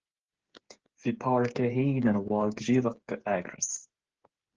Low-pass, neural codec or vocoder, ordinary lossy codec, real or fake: 7.2 kHz; codec, 16 kHz, 8 kbps, FreqCodec, smaller model; Opus, 16 kbps; fake